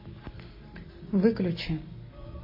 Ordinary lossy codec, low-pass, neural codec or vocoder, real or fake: MP3, 24 kbps; 5.4 kHz; none; real